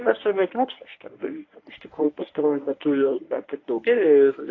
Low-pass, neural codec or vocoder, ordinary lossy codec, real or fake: 7.2 kHz; codec, 24 kHz, 0.9 kbps, WavTokenizer, medium speech release version 1; AAC, 32 kbps; fake